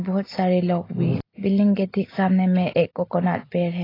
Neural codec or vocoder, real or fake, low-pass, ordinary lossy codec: none; real; 5.4 kHz; AAC, 24 kbps